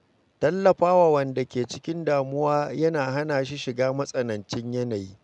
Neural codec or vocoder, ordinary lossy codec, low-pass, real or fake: none; none; 10.8 kHz; real